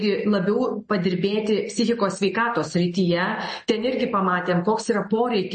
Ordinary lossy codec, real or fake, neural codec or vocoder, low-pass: MP3, 32 kbps; real; none; 9.9 kHz